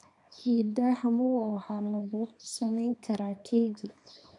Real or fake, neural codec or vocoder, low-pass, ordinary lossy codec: fake; codec, 24 kHz, 0.9 kbps, WavTokenizer, small release; 10.8 kHz; none